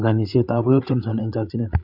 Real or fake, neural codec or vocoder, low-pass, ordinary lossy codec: fake; codec, 16 kHz, 8 kbps, FreqCodec, larger model; 5.4 kHz; none